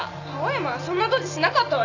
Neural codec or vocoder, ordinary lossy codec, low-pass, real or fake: none; none; 7.2 kHz; real